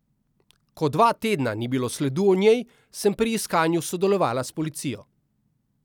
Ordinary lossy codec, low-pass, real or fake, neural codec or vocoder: none; 19.8 kHz; real; none